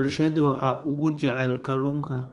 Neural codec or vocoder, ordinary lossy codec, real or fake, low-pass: codec, 24 kHz, 1 kbps, SNAC; none; fake; 10.8 kHz